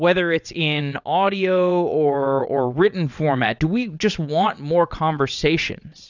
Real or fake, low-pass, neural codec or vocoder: fake; 7.2 kHz; vocoder, 22.05 kHz, 80 mel bands, WaveNeXt